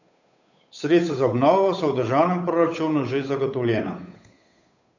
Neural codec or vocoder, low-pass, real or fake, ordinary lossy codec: codec, 16 kHz, 8 kbps, FunCodec, trained on Chinese and English, 25 frames a second; 7.2 kHz; fake; none